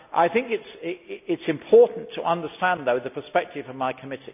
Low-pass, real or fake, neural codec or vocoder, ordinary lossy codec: 3.6 kHz; real; none; none